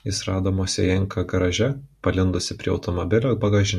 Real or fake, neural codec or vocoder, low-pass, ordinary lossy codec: real; none; 14.4 kHz; MP3, 64 kbps